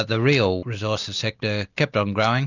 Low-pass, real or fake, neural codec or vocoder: 7.2 kHz; real; none